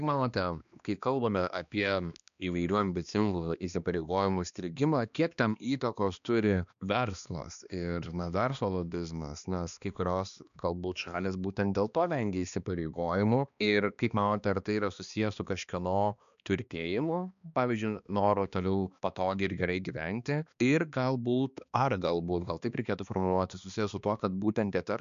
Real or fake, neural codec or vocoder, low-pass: fake; codec, 16 kHz, 2 kbps, X-Codec, HuBERT features, trained on balanced general audio; 7.2 kHz